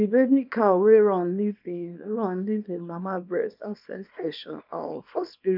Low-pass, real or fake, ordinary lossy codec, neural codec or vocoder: 5.4 kHz; fake; none; codec, 24 kHz, 0.9 kbps, WavTokenizer, small release